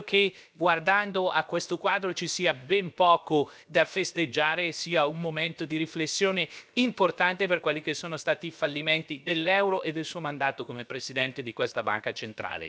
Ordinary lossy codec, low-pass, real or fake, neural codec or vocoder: none; none; fake; codec, 16 kHz, about 1 kbps, DyCAST, with the encoder's durations